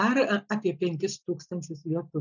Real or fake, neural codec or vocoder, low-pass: real; none; 7.2 kHz